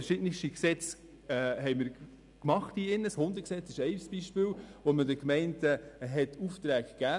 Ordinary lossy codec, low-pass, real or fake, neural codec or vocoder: none; 10.8 kHz; real; none